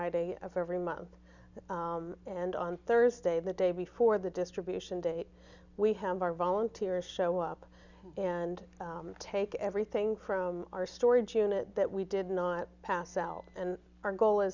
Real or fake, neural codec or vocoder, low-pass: real; none; 7.2 kHz